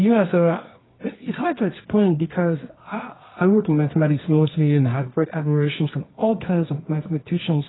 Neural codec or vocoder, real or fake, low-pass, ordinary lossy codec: codec, 24 kHz, 0.9 kbps, WavTokenizer, medium music audio release; fake; 7.2 kHz; AAC, 16 kbps